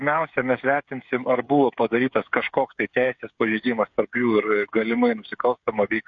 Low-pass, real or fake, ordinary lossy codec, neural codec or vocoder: 7.2 kHz; fake; MP3, 48 kbps; codec, 16 kHz, 8 kbps, FreqCodec, smaller model